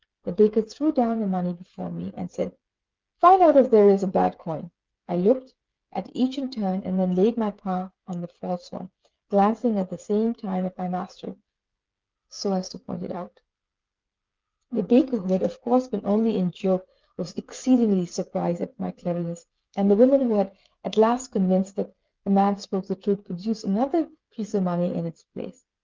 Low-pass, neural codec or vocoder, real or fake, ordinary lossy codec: 7.2 kHz; codec, 16 kHz, 8 kbps, FreqCodec, smaller model; fake; Opus, 24 kbps